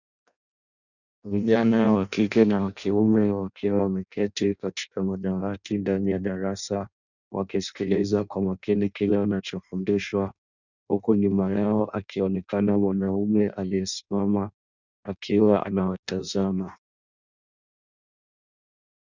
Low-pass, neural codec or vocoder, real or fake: 7.2 kHz; codec, 16 kHz in and 24 kHz out, 0.6 kbps, FireRedTTS-2 codec; fake